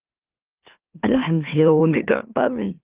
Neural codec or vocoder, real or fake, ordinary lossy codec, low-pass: autoencoder, 44.1 kHz, a latent of 192 numbers a frame, MeloTTS; fake; Opus, 32 kbps; 3.6 kHz